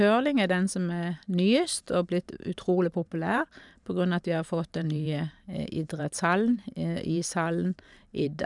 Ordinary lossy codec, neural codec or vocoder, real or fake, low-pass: none; vocoder, 44.1 kHz, 128 mel bands every 512 samples, BigVGAN v2; fake; 10.8 kHz